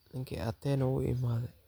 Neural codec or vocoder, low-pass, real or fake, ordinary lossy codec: none; none; real; none